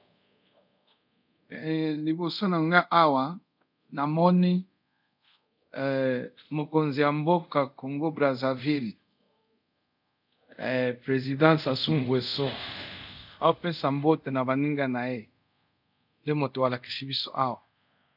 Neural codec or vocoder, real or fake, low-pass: codec, 24 kHz, 0.5 kbps, DualCodec; fake; 5.4 kHz